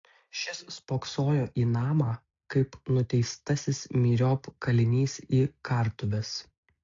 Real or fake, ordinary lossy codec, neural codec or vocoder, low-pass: real; AAC, 48 kbps; none; 7.2 kHz